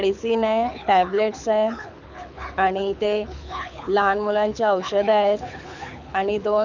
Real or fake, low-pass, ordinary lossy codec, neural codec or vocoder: fake; 7.2 kHz; none; codec, 24 kHz, 6 kbps, HILCodec